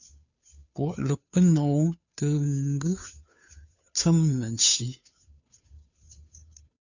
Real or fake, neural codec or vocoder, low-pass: fake; codec, 16 kHz, 2 kbps, FunCodec, trained on Chinese and English, 25 frames a second; 7.2 kHz